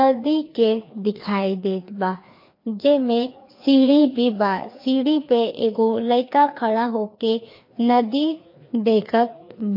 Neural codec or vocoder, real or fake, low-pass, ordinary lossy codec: codec, 16 kHz, 2 kbps, FreqCodec, larger model; fake; 5.4 kHz; MP3, 32 kbps